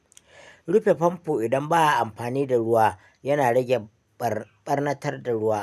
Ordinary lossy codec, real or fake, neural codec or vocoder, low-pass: none; real; none; 14.4 kHz